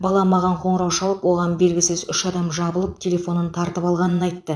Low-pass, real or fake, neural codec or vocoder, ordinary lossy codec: none; fake; vocoder, 22.05 kHz, 80 mel bands, Vocos; none